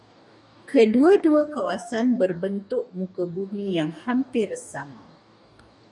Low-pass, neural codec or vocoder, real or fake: 10.8 kHz; codec, 44.1 kHz, 2.6 kbps, DAC; fake